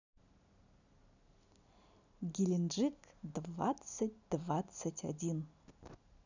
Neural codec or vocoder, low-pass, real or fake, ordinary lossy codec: none; 7.2 kHz; real; none